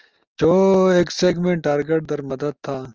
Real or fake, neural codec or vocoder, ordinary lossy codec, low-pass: real; none; Opus, 24 kbps; 7.2 kHz